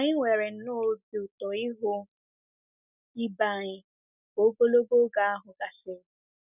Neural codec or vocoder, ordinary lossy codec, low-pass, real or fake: none; none; 3.6 kHz; real